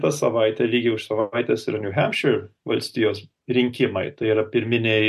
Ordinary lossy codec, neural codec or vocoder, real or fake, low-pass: MP3, 64 kbps; none; real; 14.4 kHz